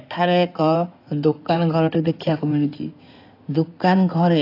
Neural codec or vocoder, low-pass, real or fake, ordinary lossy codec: codec, 16 kHz in and 24 kHz out, 2.2 kbps, FireRedTTS-2 codec; 5.4 kHz; fake; AAC, 32 kbps